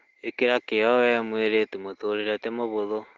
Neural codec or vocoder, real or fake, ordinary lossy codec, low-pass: none; real; Opus, 16 kbps; 7.2 kHz